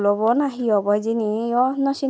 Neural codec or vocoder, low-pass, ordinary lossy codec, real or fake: none; none; none; real